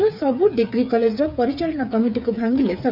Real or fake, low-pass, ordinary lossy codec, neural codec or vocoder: fake; 5.4 kHz; none; codec, 16 kHz, 8 kbps, FreqCodec, smaller model